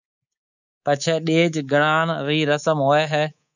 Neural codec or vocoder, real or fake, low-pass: codec, 24 kHz, 3.1 kbps, DualCodec; fake; 7.2 kHz